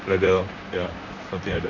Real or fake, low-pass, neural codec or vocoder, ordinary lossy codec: fake; 7.2 kHz; vocoder, 44.1 kHz, 128 mel bands, Pupu-Vocoder; none